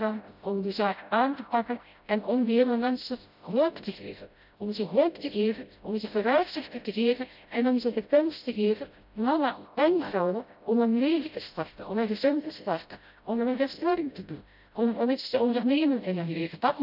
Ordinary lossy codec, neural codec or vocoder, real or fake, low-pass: none; codec, 16 kHz, 0.5 kbps, FreqCodec, smaller model; fake; 5.4 kHz